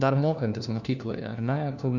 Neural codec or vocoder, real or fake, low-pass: codec, 16 kHz, 1 kbps, FunCodec, trained on LibriTTS, 50 frames a second; fake; 7.2 kHz